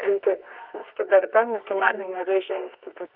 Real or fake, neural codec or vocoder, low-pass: fake; codec, 24 kHz, 0.9 kbps, WavTokenizer, medium music audio release; 5.4 kHz